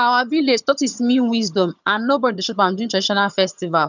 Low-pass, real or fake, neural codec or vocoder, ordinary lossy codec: 7.2 kHz; fake; vocoder, 22.05 kHz, 80 mel bands, HiFi-GAN; none